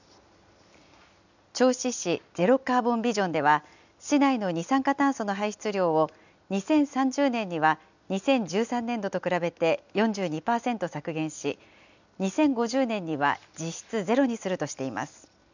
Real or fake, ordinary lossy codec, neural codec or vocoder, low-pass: real; none; none; 7.2 kHz